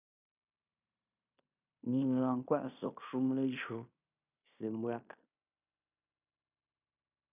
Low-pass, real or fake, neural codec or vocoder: 3.6 kHz; fake; codec, 16 kHz in and 24 kHz out, 0.9 kbps, LongCat-Audio-Codec, fine tuned four codebook decoder